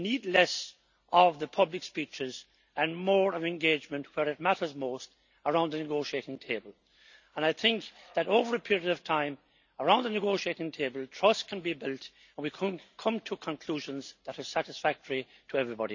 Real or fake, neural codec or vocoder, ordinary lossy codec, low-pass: real; none; none; 7.2 kHz